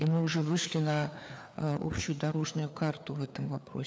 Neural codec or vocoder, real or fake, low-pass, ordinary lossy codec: codec, 16 kHz, 4 kbps, FreqCodec, larger model; fake; none; none